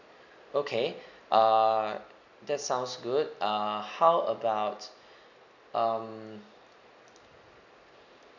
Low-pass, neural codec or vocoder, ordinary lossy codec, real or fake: 7.2 kHz; none; none; real